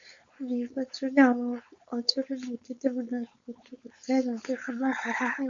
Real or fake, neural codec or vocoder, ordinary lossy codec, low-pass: fake; codec, 16 kHz, 4.8 kbps, FACodec; Opus, 64 kbps; 7.2 kHz